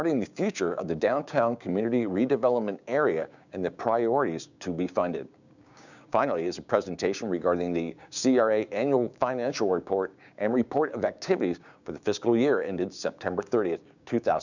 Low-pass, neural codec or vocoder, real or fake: 7.2 kHz; codec, 16 kHz, 6 kbps, DAC; fake